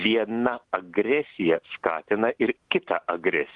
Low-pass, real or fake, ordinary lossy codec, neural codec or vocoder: 10.8 kHz; real; Opus, 24 kbps; none